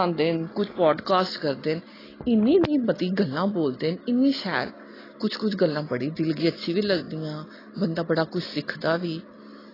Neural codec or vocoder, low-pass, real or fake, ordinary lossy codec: none; 5.4 kHz; real; AAC, 24 kbps